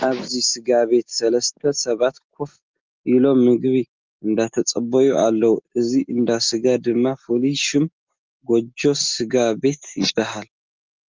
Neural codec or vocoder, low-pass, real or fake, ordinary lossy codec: none; 7.2 kHz; real; Opus, 24 kbps